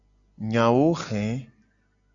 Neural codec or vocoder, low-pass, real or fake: none; 7.2 kHz; real